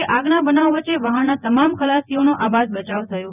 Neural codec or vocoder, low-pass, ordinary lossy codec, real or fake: vocoder, 24 kHz, 100 mel bands, Vocos; 3.6 kHz; none; fake